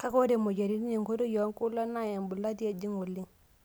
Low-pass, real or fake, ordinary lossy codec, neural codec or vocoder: none; real; none; none